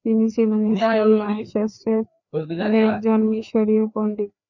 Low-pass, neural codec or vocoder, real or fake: 7.2 kHz; codec, 16 kHz, 2 kbps, FreqCodec, larger model; fake